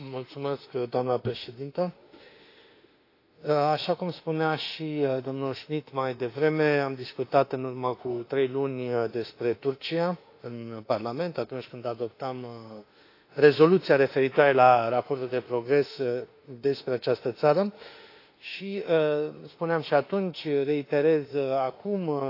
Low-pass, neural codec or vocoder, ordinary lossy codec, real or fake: 5.4 kHz; autoencoder, 48 kHz, 32 numbers a frame, DAC-VAE, trained on Japanese speech; AAC, 32 kbps; fake